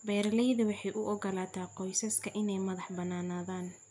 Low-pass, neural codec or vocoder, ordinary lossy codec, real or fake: 10.8 kHz; none; none; real